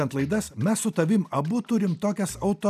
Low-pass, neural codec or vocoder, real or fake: 14.4 kHz; none; real